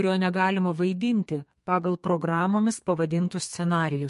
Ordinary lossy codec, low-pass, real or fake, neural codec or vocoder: MP3, 48 kbps; 14.4 kHz; fake; codec, 32 kHz, 1.9 kbps, SNAC